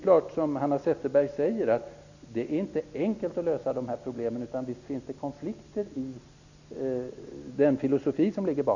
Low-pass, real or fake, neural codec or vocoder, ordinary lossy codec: 7.2 kHz; real; none; none